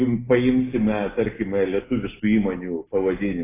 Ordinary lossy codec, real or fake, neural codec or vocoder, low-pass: AAC, 16 kbps; real; none; 3.6 kHz